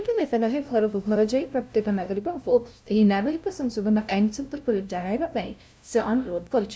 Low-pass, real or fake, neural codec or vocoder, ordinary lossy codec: none; fake; codec, 16 kHz, 0.5 kbps, FunCodec, trained on LibriTTS, 25 frames a second; none